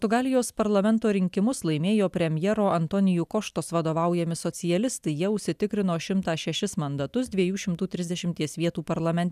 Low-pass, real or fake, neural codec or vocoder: 14.4 kHz; real; none